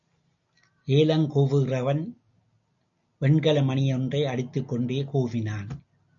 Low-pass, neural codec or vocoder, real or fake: 7.2 kHz; none; real